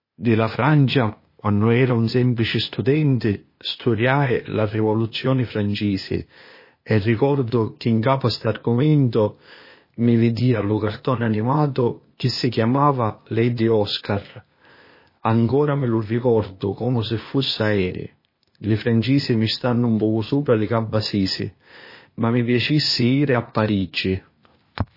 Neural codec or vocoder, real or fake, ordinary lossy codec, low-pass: codec, 16 kHz, 0.8 kbps, ZipCodec; fake; MP3, 24 kbps; 5.4 kHz